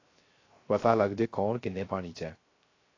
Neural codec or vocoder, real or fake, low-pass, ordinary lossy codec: codec, 16 kHz, 0.3 kbps, FocalCodec; fake; 7.2 kHz; AAC, 32 kbps